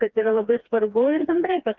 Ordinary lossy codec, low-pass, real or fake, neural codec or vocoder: Opus, 32 kbps; 7.2 kHz; fake; codec, 16 kHz, 2 kbps, FreqCodec, smaller model